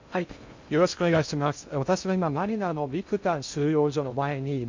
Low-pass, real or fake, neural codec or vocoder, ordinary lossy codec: 7.2 kHz; fake; codec, 16 kHz in and 24 kHz out, 0.6 kbps, FocalCodec, streaming, 2048 codes; MP3, 64 kbps